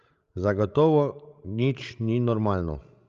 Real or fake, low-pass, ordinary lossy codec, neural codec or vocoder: fake; 7.2 kHz; Opus, 32 kbps; codec, 16 kHz, 16 kbps, FreqCodec, larger model